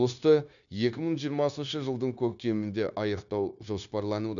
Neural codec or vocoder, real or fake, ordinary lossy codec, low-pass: codec, 16 kHz, 0.9 kbps, LongCat-Audio-Codec; fake; none; 7.2 kHz